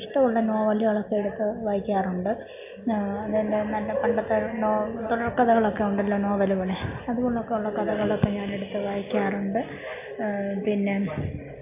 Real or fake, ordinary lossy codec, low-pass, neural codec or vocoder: real; AAC, 32 kbps; 3.6 kHz; none